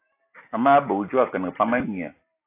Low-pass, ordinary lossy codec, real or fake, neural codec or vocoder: 3.6 kHz; AAC, 24 kbps; real; none